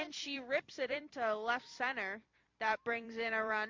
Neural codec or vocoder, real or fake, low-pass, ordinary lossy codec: vocoder, 44.1 kHz, 128 mel bands every 256 samples, BigVGAN v2; fake; 7.2 kHz; MP3, 48 kbps